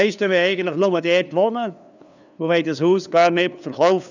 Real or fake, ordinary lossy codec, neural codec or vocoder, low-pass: fake; none; codec, 16 kHz, 2 kbps, FunCodec, trained on LibriTTS, 25 frames a second; 7.2 kHz